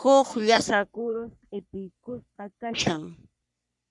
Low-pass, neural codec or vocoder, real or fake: 10.8 kHz; codec, 44.1 kHz, 3.4 kbps, Pupu-Codec; fake